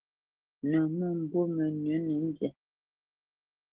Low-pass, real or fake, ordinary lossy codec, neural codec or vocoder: 3.6 kHz; real; Opus, 16 kbps; none